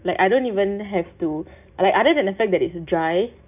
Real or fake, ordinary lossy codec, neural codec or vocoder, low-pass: real; none; none; 3.6 kHz